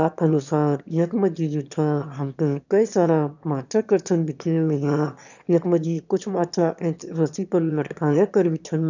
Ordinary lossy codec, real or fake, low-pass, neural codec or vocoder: none; fake; 7.2 kHz; autoencoder, 22.05 kHz, a latent of 192 numbers a frame, VITS, trained on one speaker